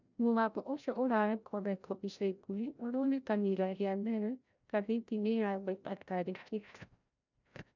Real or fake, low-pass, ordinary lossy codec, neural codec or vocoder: fake; 7.2 kHz; none; codec, 16 kHz, 0.5 kbps, FreqCodec, larger model